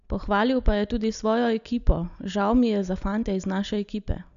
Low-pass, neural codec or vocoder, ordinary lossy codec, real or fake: 7.2 kHz; none; none; real